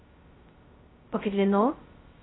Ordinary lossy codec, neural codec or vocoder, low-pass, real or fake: AAC, 16 kbps; codec, 16 kHz, 0.2 kbps, FocalCodec; 7.2 kHz; fake